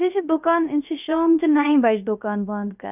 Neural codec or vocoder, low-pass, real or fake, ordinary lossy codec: codec, 16 kHz, 0.3 kbps, FocalCodec; 3.6 kHz; fake; none